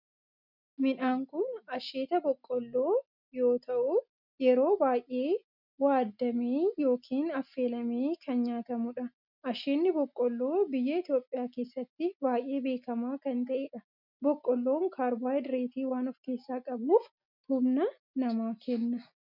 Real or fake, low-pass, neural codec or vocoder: real; 5.4 kHz; none